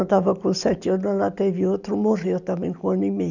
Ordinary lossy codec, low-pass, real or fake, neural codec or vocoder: none; 7.2 kHz; real; none